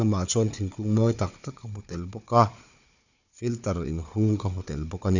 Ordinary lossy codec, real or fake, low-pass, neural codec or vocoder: none; fake; 7.2 kHz; codec, 16 kHz, 4 kbps, FunCodec, trained on Chinese and English, 50 frames a second